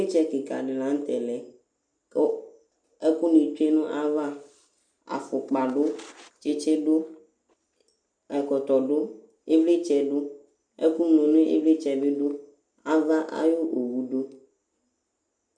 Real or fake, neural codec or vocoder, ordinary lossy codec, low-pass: real; none; MP3, 64 kbps; 9.9 kHz